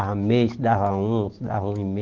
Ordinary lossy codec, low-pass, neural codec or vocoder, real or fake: Opus, 16 kbps; 7.2 kHz; none; real